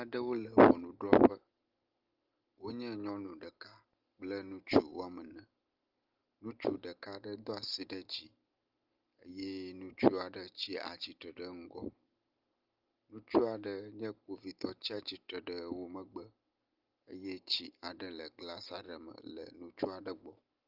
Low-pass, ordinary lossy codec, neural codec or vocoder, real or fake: 5.4 kHz; Opus, 24 kbps; none; real